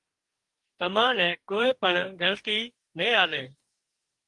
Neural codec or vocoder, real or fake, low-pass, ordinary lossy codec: codec, 44.1 kHz, 2.6 kbps, DAC; fake; 10.8 kHz; Opus, 24 kbps